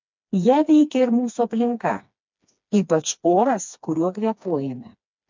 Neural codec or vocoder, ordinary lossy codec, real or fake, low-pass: codec, 16 kHz, 2 kbps, FreqCodec, smaller model; MP3, 64 kbps; fake; 7.2 kHz